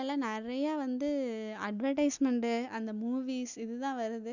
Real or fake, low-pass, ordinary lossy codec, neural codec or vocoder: real; 7.2 kHz; none; none